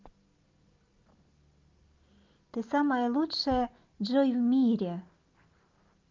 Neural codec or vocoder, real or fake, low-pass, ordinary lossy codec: none; real; 7.2 kHz; Opus, 24 kbps